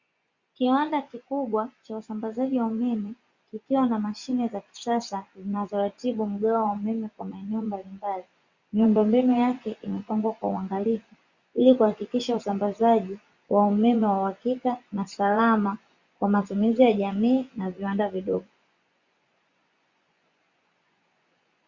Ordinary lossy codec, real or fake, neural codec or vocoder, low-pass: Opus, 64 kbps; fake; vocoder, 24 kHz, 100 mel bands, Vocos; 7.2 kHz